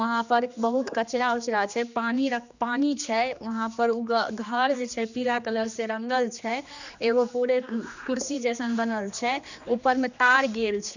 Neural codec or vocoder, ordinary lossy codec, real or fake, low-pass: codec, 16 kHz, 2 kbps, X-Codec, HuBERT features, trained on general audio; none; fake; 7.2 kHz